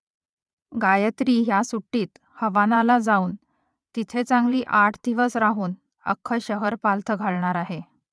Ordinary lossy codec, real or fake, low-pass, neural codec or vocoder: none; fake; none; vocoder, 22.05 kHz, 80 mel bands, Vocos